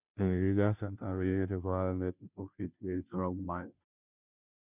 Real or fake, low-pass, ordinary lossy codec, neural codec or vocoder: fake; 3.6 kHz; none; codec, 16 kHz, 0.5 kbps, FunCodec, trained on Chinese and English, 25 frames a second